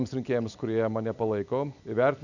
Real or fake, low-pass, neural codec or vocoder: real; 7.2 kHz; none